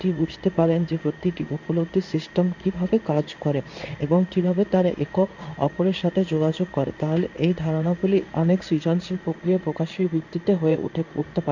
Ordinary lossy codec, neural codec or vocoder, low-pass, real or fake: none; codec, 16 kHz in and 24 kHz out, 1 kbps, XY-Tokenizer; 7.2 kHz; fake